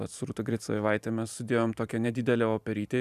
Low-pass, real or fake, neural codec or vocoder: 14.4 kHz; fake; vocoder, 44.1 kHz, 128 mel bands every 512 samples, BigVGAN v2